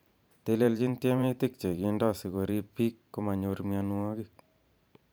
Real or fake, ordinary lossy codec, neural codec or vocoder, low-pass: fake; none; vocoder, 44.1 kHz, 128 mel bands every 512 samples, BigVGAN v2; none